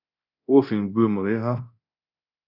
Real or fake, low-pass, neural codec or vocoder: fake; 5.4 kHz; codec, 24 kHz, 0.9 kbps, DualCodec